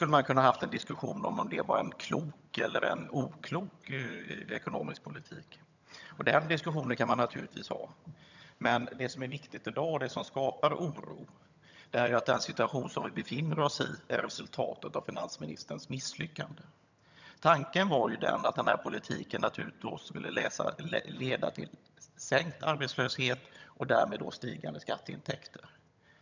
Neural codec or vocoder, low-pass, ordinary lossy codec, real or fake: vocoder, 22.05 kHz, 80 mel bands, HiFi-GAN; 7.2 kHz; none; fake